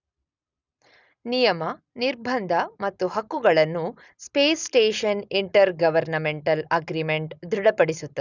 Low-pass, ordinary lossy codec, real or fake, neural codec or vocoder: 7.2 kHz; none; real; none